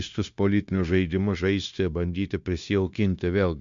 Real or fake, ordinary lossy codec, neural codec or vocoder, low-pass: fake; MP3, 64 kbps; codec, 16 kHz, 0.9 kbps, LongCat-Audio-Codec; 7.2 kHz